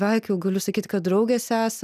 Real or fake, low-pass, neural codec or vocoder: real; 14.4 kHz; none